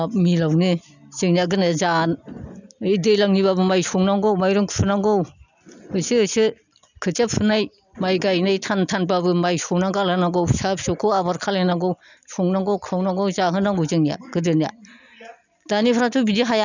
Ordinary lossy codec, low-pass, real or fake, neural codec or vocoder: none; 7.2 kHz; real; none